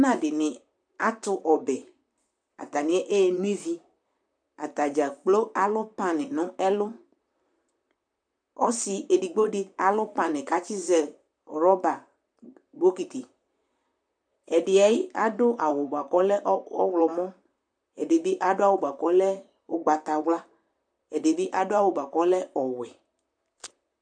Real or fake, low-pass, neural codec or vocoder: fake; 9.9 kHz; vocoder, 44.1 kHz, 128 mel bands, Pupu-Vocoder